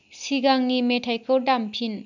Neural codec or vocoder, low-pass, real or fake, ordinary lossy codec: none; 7.2 kHz; real; none